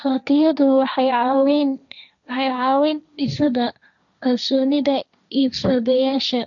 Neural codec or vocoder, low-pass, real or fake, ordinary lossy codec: codec, 16 kHz, 1.1 kbps, Voila-Tokenizer; 7.2 kHz; fake; none